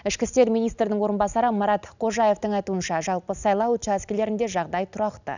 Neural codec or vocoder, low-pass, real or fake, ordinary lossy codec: none; 7.2 kHz; real; none